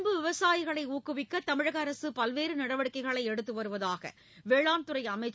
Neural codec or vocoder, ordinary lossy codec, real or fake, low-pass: none; none; real; none